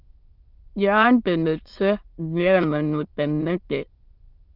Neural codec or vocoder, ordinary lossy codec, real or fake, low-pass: autoencoder, 22.05 kHz, a latent of 192 numbers a frame, VITS, trained on many speakers; Opus, 32 kbps; fake; 5.4 kHz